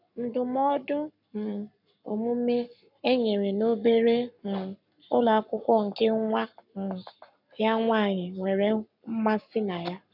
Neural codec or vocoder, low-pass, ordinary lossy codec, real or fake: codec, 44.1 kHz, 7.8 kbps, Pupu-Codec; 5.4 kHz; none; fake